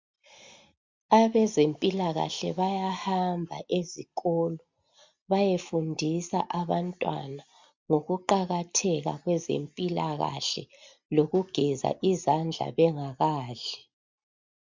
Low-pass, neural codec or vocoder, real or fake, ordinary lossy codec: 7.2 kHz; none; real; MP3, 64 kbps